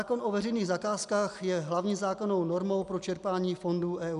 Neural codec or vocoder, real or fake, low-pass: none; real; 10.8 kHz